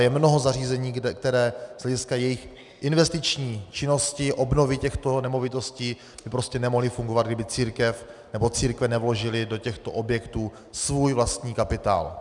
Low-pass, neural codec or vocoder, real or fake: 10.8 kHz; none; real